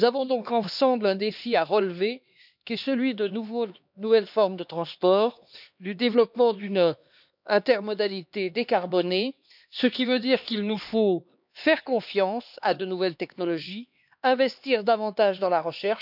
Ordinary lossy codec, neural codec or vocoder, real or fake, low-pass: none; codec, 16 kHz, 2 kbps, X-Codec, HuBERT features, trained on LibriSpeech; fake; 5.4 kHz